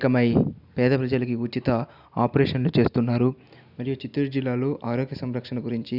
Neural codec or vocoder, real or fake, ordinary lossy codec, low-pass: none; real; none; 5.4 kHz